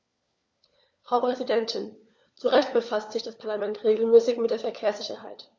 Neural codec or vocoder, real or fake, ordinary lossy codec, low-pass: codec, 16 kHz, 4 kbps, FunCodec, trained on LibriTTS, 50 frames a second; fake; none; none